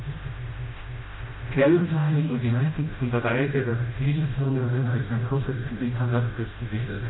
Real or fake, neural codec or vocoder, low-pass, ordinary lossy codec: fake; codec, 16 kHz, 0.5 kbps, FreqCodec, smaller model; 7.2 kHz; AAC, 16 kbps